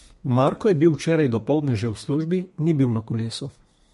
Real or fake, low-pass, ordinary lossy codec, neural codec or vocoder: fake; 14.4 kHz; MP3, 48 kbps; codec, 32 kHz, 1.9 kbps, SNAC